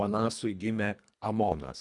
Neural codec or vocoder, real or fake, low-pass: codec, 24 kHz, 1.5 kbps, HILCodec; fake; 10.8 kHz